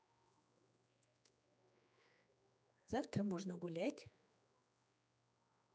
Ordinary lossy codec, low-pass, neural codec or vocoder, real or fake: none; none; codec, 16 kHz, 2 kbps, X-Codec, HuBERT features, trained on general audio; fake